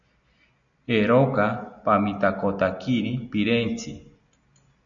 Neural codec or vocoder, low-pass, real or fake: none; 7.2 kHz; real